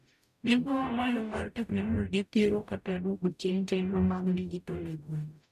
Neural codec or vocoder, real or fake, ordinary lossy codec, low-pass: codec, 44.1 kHz, 0.9 kbps, DAC; fake; none; 14.4 kHz